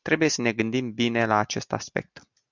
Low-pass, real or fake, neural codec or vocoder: 7.2 kHz; real; none